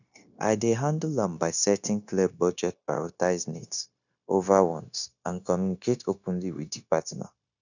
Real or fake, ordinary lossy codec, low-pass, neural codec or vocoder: fake; none; 7.2 kHz; codec, 16 kHz, 0.9 kbps, LongCat-Audio-Codec